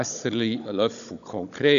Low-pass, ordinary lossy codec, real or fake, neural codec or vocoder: 7.2 kHz; none; fake; codec, 16 kHz, 16 kbps, FunCodec, trained on Chinese and English, 50 frames a second